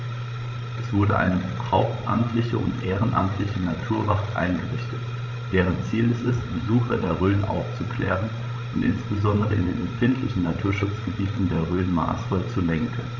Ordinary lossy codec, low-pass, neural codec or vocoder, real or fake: none; 7.2 kHz; codec, 16 kHz, 16 kbps, FreqCodec, larger model; fake